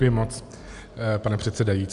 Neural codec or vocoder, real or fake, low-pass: none; real; 10.8 kHz